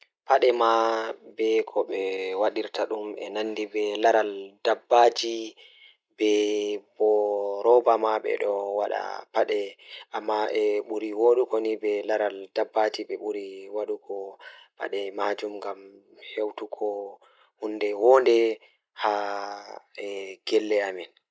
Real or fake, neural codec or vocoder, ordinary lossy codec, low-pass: real; none; none; none